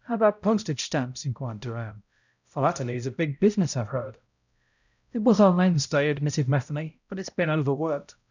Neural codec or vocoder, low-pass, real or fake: codec, 16 kHz, 0.5 kbps, X-Codec, HuBERT features, trained on balanced general audio; 7.2 kHz; fake